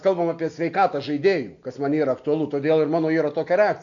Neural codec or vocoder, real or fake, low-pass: none; real; 7.2 kHz